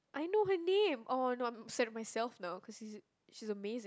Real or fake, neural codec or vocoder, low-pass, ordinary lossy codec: real; none; none; none